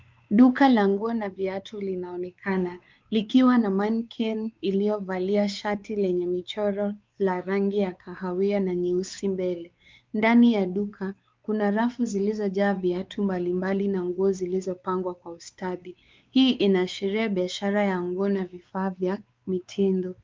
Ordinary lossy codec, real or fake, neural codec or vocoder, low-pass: Opus, 32 kbps; fake; codec, 16 kHz, 4 kbps, X-Codec, WavLM features, trained on Multilingual LibriSpeech; 7.2 kHz